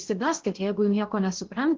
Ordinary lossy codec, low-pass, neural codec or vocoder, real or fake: Opus, 16 kbps; 7.2 kHz; codec, 16 kHz in and 24 kHz out, 0.6 kbps, FocalCodec, streaming, 2048 codes; fake